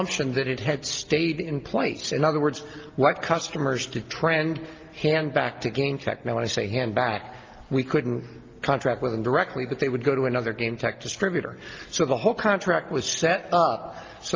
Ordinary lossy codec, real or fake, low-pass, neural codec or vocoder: Opus, 32 kbps; real; 7.2 kHz; none